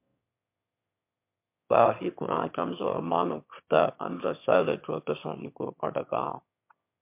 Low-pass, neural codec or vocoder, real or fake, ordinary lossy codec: 3.6 kHz; autoencoder, 22.05 kHz, a latent of 192 numbers a frame, VITS, trained on one speaker; fake; MP3, 32 kbps